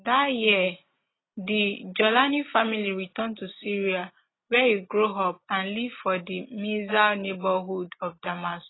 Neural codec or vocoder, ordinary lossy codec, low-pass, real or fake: none; AAC, 16 kbps; 7.2 kHz; real